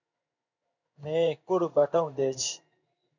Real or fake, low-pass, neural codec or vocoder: fake; 7.2 kHz; codec, 16 kHz in and 24 kHz out, 1 kbps, XY-Tokenizer